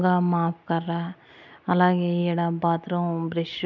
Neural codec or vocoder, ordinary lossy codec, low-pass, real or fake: none; none; 7.2 kHz; real